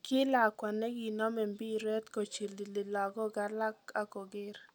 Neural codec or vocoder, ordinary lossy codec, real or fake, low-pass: none; none; real; none